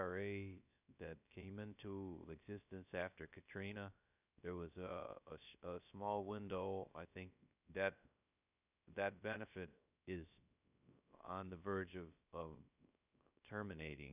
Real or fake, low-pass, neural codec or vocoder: fake; 3.6 kHz; codec, 16 kHz, 0.3 kbps, FocalCodec